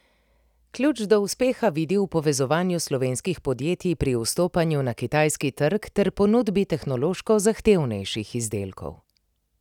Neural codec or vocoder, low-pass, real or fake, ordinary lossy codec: none; 19.8 kHz; real; none